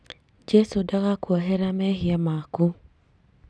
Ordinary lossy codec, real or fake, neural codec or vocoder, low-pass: none; real; none; none